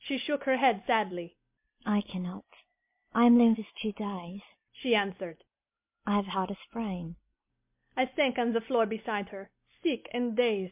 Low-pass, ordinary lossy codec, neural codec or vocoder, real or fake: 3.6 kHz; MP3, 32 kbps; none; real